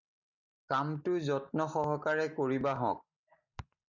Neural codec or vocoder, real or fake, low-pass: none; real; 7.2 kHz